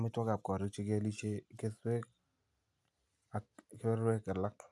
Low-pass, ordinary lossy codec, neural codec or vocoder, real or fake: none; none; none; real